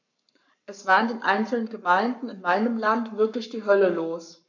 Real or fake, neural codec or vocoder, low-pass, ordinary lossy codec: fake; codec, 44.1 kHz, 7.8 kbps, Pupu-Codec; 7.2 kHz; none